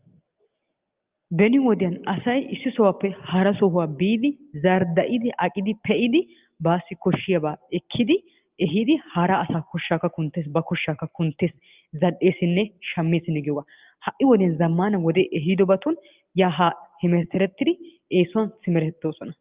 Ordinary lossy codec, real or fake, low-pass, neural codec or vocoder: Opus, 32 kbps; real; 3.6 kHz; none